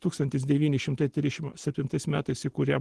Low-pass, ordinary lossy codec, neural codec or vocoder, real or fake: 10.8 kHz; Opus, 16 kbps; none; real